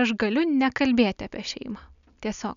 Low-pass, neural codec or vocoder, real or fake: 7.2 kHz; none; real